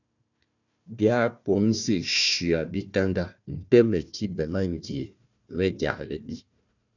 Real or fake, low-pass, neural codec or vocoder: fake; 7.2 kHz; codec, 16 kHz, 1 kbps, FunCodec, trained on Chinese and English, 50 frames a second